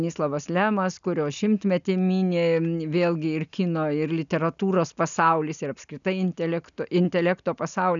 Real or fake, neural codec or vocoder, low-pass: real; none; 7.2 kHz